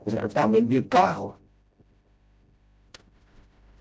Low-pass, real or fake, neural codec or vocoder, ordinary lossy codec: none; fake; codec, 16 kHz, 0.5 kbps, FreqCodec, smaller model; none